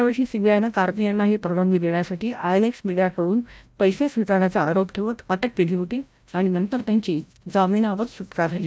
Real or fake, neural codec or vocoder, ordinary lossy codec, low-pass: fake; codec, 16 kHz, 0.5 kbps, FreqCodec, larger model; none; none